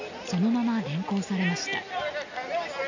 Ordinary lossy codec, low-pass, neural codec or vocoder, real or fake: none; 7.2 kHz; none; real